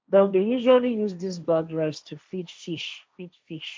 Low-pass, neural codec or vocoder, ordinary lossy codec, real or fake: none; codec, 16 kHz, 1.1 kbps, Voila-Tokenizer; none; fake